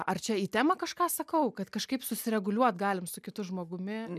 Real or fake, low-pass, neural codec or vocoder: real; 14.4 kHz; none